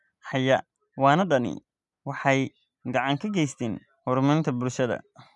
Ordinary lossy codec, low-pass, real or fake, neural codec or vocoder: none; 10.8 kHz; real; none